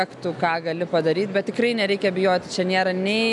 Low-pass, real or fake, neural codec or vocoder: 10.8 kHz; real; none